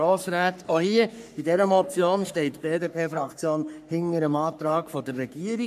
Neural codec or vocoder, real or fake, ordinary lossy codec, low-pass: codec, 44.1 kHz, 3.4 kbps, Pupu-Codec; fake; none; 14.4 kHz